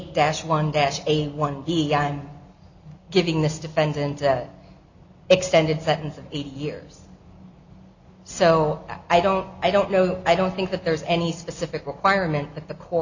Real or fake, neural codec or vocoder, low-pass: real; none; 7.2 kHz